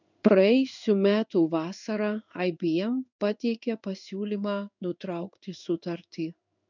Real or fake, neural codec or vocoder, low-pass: fake; codec, 16 kHz in and 24 kHz out, 1 kbps, XY-Tokenizer; 7.2 kHz